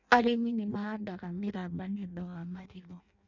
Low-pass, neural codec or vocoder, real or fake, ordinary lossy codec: 7.2 kHz; codec, 16 kHz in and 24 kHz out, 0.6 kbps, FireRedTTS-2 codec; fake; none